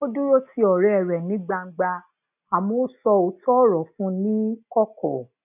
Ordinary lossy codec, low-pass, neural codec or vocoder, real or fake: none; 3.6 kHz; none; real